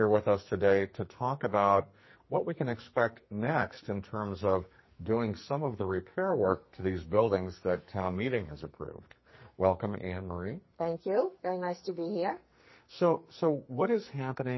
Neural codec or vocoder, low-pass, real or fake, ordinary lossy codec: codec, 44.1 kHz, 2.6 kbps, SNAC; 7.2 kHz; fake; MP3, 24 kbps